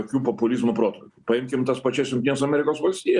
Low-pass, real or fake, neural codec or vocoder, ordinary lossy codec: 10.8 kHz; real; none; Opus, 64 kbps